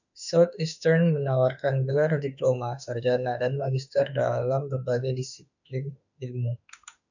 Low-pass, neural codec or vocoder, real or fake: 7.2 kHz; autoencoder, 48 kHz, 32 numbers a frame, DAC-VAE, trained on Japanese speech; fake